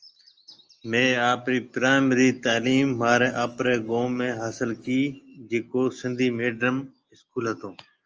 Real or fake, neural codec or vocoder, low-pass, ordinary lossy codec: real; none; 7.2 kHz; Opus, 24 kbps